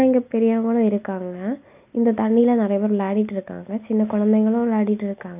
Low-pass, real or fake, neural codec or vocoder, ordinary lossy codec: 3.6 kHz; real; none; none